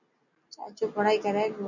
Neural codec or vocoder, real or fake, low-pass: none; real; 7.2 kHz